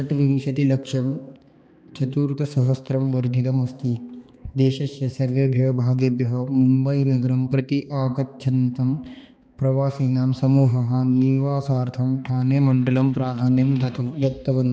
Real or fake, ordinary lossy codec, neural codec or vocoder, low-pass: fake; none; codec, 16 kHz, 2 kbps, X-Codec, HuBERT features, trained on balanced general audio; none